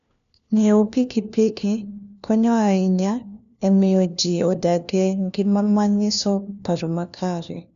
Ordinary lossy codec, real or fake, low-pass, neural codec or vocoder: none; fake; 7.2 kHz; codec, 16 kHz, 1 kbps, FunCodec, trained on LibriTTS, 50 frames a second